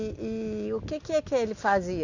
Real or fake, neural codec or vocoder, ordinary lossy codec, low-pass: real; none; none; 7.2 kHz